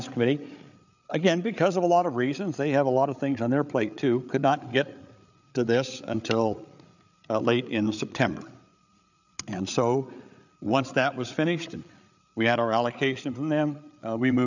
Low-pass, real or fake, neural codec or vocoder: 7.2 kHz; fake; codec, 16 kHz, 8 kbps, FreqCodec, larger model